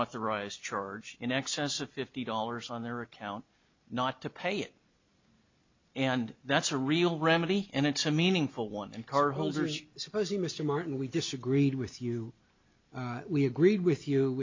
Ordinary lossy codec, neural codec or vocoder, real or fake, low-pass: MP3, 64 kbps; none; real; 7.2 kHz